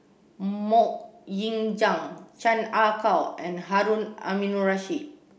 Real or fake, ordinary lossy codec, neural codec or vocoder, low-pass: real; none; none; none